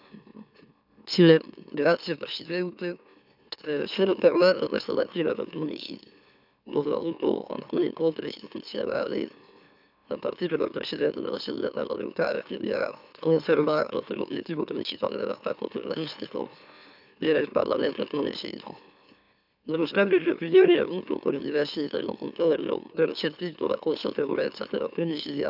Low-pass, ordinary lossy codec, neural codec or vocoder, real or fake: 5.4 kHz; none; autoencoder, 44.1 kHz, a latent of 192 numbers a frame, MeloTTS; fake